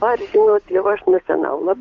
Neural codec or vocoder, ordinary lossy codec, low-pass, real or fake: codec, 16 kHz, 8 kbps, FunCodec, trained on Chinese and English, 25 frames a second; Opus, 32 kbps; 7.2 kHz; fake